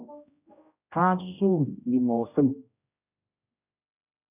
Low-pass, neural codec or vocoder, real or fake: 3.6 kHz; codec, 16 kHz, 0.5 kbps, X-Codec, HuBERT features, trained on general audio; fake